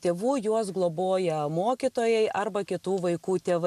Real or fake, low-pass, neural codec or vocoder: real; 14.4 kHz; none